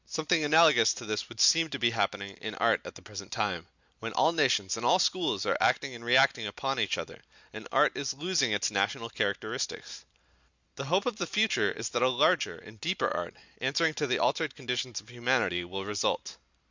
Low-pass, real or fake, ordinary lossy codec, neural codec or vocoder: 7.2 kHz; real; Opus, 64 kbps; none